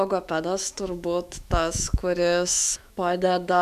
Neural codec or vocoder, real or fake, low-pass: autoencoder, 48 kHz, 128 numbers a frame, DAC-VAE, trained on Japanese speech; fake; 14.4 kHz